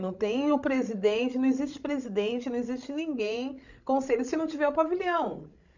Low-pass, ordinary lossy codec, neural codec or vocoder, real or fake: 7.2 kHz; none; codec, 16 kHz, 16 kbps, FreqCodec, larger model; fake